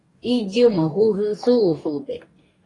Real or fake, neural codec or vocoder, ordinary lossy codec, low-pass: fake; codec, 44.1 kHz, 2.6 kbps, DAC; AAC, 32 kbps; 10.8 kHz